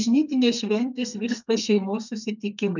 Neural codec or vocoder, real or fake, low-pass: codec, 32 kHz, 1.9 kbps, SNAC; fake; 7.2 kHz